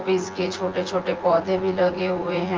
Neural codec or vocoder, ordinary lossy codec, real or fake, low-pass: vocoder, 24 kHz, 100 mel bands, Vocos; Opus, 16 kbps; fake; 7.2 kHz